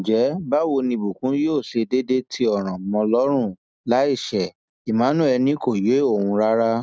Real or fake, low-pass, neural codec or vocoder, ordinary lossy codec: real; none; none; none